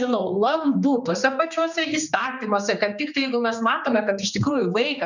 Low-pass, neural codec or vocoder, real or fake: 7.2 kHz; codec, 16 kHz, 2 kbps, X-Codec, HuBERT features, trained on general audio; fake